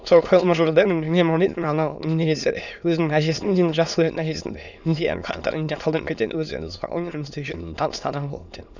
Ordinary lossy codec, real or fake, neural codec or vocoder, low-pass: none; fake; autoencoder, 22.05 kHz, a latent of 192 numbers a frame, VITS, trained on many speakers; 7.2 kHz